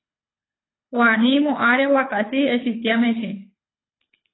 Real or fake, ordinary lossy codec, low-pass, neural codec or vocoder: fake; AAC, 16 kbps; 7.2 kHz; codec, 24 kHz, 6 kbps, HILCodec